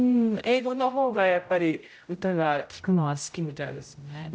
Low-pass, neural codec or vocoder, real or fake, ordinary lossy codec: none; codec, 16 kHz, 0.5 kbps, X-Codec, HuBERT features, trained on general audio; fake; none